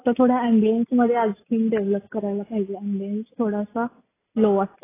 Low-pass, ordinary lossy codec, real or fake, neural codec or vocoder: 3.6 kHz; AAC, 16 kbps; real; none